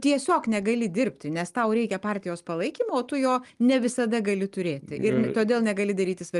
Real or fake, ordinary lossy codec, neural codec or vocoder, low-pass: real; Opus, 32 kbps; none; 10.8 kHz